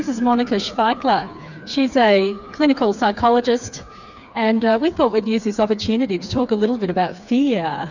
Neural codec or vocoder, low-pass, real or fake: codec, 16 kHz, 4 kbps, FreqCodec, smaller model; 7.2 kHz; fake